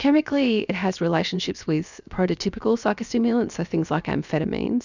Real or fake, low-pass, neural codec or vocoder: fake; 7.2 kHz; codec, 16 kHz, 0.7 kbps, FocalCodec